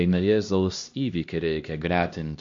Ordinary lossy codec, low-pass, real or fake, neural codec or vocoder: MP3, 48 kbps; 7.2 kHz; fake; codec, 16 kHz, 1 kbps, X-Codec, HuBERT features, trained on LibriSpeech